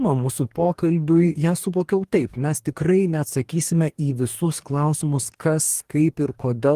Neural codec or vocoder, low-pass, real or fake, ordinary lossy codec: codec, 44.1 kHz, 2.6 kbps, DAC; 14.4 kHz; fake; Opus, 24 kbps